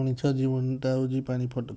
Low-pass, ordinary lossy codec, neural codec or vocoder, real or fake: none; none; none; real